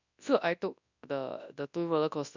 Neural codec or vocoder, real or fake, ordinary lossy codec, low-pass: codec, 24 kHz, 0.9 kbps, WavTokenizer, large speech release; fake; none; 7.2 kHz